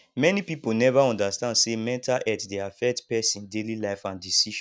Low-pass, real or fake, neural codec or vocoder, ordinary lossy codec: none; real; none; none